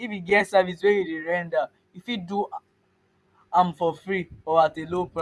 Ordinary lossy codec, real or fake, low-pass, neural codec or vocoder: none; fake; none; vocoder, 24 kHz, 100 mel bands, Vocos